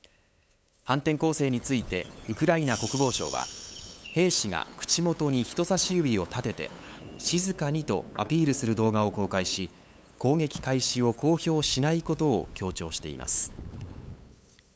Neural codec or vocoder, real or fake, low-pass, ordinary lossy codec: codec, 16 kHz, 8 kbps, FunCodec, trained on LibriTTS, 25 frames a second; fake; none; none